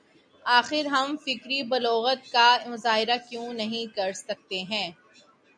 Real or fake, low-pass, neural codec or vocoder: real; 9.9 kHz; none